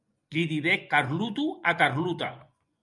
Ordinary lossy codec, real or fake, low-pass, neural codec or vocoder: MP3, 96 kbps; fake; 10.8 kHz; vocoder, 24 kHz, 100 mel bands, Vocos